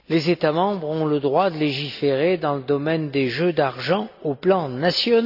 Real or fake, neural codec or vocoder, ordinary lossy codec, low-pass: real; none; none; 5.4 kHz